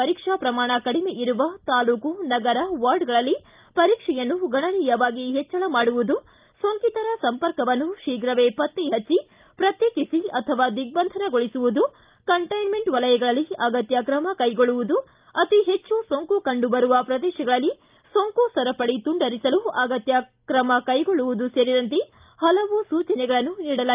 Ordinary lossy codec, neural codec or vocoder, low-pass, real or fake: Opus, 24 kbps; none; 3.6 kHz; real